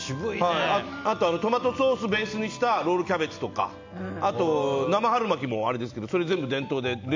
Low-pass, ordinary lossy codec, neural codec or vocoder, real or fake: 7.2 kHz; MP3, 64 kbps; none; real